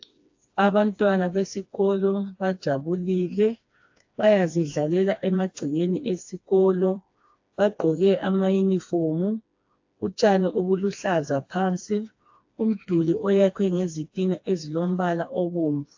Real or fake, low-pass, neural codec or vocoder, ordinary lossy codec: fake; 7.2 kHz; codec, 16 kHz, 2 kbps, FreqCodec, smaller model; AAC, 48 kbps